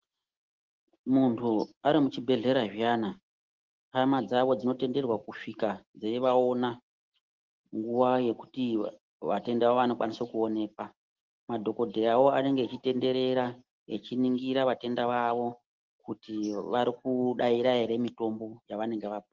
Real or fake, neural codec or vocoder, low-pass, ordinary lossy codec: real; none; 7.2 kHz; Opus, 16 kbps